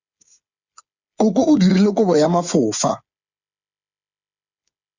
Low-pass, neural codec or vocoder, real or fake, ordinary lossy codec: 7.2 kHz; codec, 16 kHz, 8 kbps, FreqCodec, smaller model; fake; Opus, 64 kbps